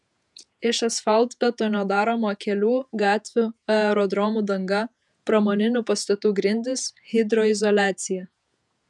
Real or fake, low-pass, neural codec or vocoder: fake; 10.8 kHz; vocoder, 48 kHz, 128 mel bands, Vocos